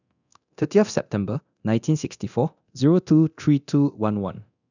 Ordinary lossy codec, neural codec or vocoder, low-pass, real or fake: none; codec, 24 kHz, 0.9 kbps, DualCodec; 7.2 kHz; fake